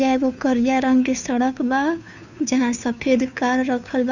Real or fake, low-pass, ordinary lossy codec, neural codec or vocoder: fake; 7.2 kHz; none; codec, 16 kHz, 2 kbps, FunCodec, trained on Chinese and English, 25 frames a second